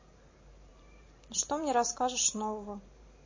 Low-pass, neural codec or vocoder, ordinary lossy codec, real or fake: 7.2 kHz; vocoder, 44.1 kHz, 128 mel bands every 512 samples, BigVGAN v2; MP3, 32 kbps; fake